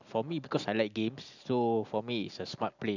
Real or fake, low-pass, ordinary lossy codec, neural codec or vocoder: real; 7.2 kHz; none; none